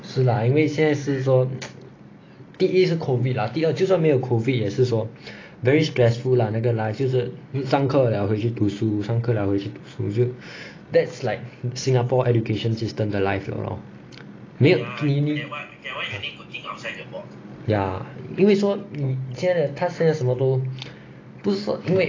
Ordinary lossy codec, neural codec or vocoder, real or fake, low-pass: AAC, 32 kbps; none; real; 7.2 kHz